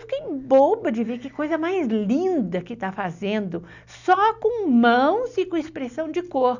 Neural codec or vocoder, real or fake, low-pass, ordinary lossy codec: none; real; 7.2 kHz; none